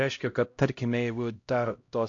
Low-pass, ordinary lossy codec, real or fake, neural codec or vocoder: 7.2 kHz; AAC, 48 kbps; fake; codec, 16 kHz, 0.5 kbps, X-Codec, HuBERT features, trained on LibriSpeech